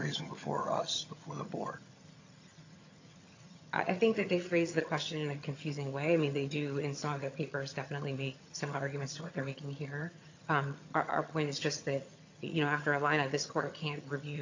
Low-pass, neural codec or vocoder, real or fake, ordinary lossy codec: 7.2 kHz; vocoder, 22.05 kHz, 80 mel bands, HiFi-GAN; fake; AAC, 48 kbps